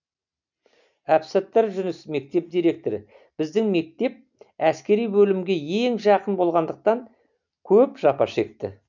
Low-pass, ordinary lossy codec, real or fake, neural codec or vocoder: 7.2 kHz; none; real; none